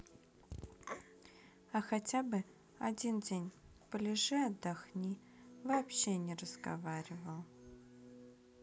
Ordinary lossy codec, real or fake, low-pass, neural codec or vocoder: none; real; none; none